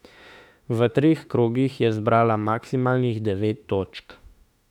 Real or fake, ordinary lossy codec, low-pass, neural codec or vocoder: fake; none; 19.8 kHz; autoencoder, 48 kHz, 32 numbers a frame, DAC-VAE, trained on Japanese speech